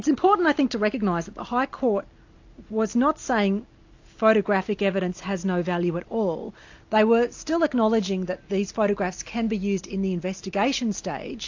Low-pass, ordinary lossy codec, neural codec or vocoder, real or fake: 7.2 kHz; AAC, 48 kbps; none; real